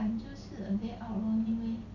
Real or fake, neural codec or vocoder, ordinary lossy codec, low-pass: real; none; none; 7.2 kHz